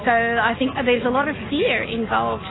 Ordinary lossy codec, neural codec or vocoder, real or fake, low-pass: AAC, 16 kbps; none; real; 7.2 kHz